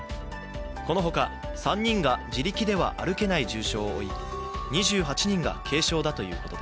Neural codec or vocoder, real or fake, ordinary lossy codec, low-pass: none; real; none; none